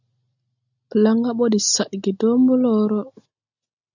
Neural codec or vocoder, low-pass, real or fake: none; 7.2 kHz; real